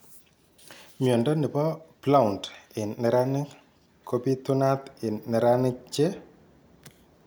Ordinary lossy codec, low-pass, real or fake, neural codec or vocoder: none; none; real; none